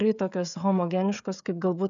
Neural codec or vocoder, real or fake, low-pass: codec, 16 kHz, 16 kbps, FreqCodec, smaller model; fake; 7.2 kHz